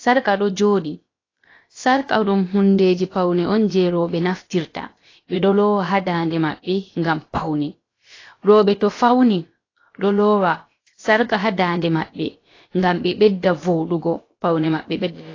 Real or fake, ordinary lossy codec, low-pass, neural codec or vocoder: fake; AAC, 32 kbps; 7.2 kHz; codec, 16 kHz, about 1 kbps, DyCAST, with the encoder's durations